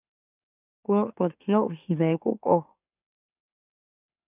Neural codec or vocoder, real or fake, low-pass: autoencoder, 44.1 kHz, a latent of 192 numbers a frame, MeloTTS; fake; 3.6 kHz